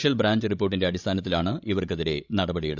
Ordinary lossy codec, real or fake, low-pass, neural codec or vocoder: none; fake; 7.2 kHz; codec, 16 kHz, 16 kbps, FreqCodec, larger model